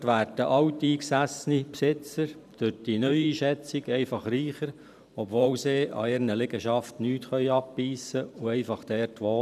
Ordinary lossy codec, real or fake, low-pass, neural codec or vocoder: none; fake; 14.4 kHz; vocoder, 44.1 kHz, 128 mel bands every 512 samples, BigVGAN v2